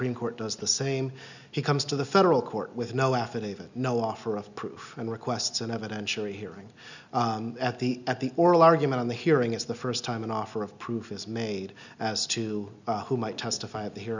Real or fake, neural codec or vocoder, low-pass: real; none; 7.2 kHz